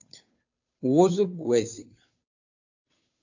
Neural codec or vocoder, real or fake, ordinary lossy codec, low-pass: codec, 16 kHz, 2 kbps, FunCodec, trained on Chinese and English, 25 frames a second; fake; AAC, 48 kbps; 7.2 kHz